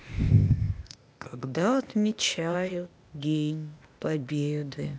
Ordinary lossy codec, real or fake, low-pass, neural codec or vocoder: none; fake; none; codec, 16 kHz, 0.8 kbps, ZipCodec